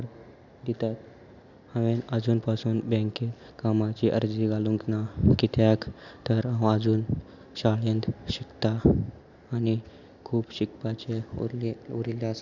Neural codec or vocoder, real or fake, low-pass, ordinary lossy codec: none; real; 7.2 kHz; none